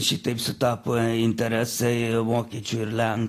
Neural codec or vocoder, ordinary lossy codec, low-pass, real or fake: none; AAC, 48 kbps; 14.4 kHz; real